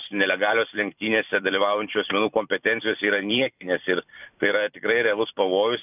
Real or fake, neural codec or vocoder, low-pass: fake; vocoder, 44.1 kHz, 128 mel bands every 256 samples, BigVGAN v2; 3.6 kHz